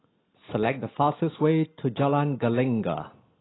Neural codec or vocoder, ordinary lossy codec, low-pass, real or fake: none; AAC, 16 kbps; 7.2 kHz; real